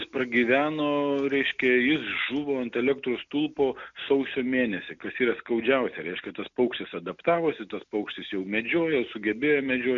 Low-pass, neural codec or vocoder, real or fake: 7.2 kHz; none; real